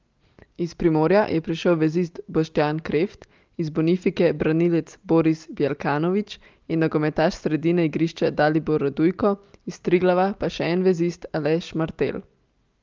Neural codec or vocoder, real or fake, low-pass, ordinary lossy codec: none; real; 7.2 kHz; Opus, 32 kbps